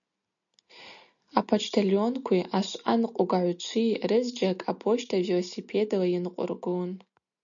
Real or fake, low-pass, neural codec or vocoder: real; 7.2 kHz; none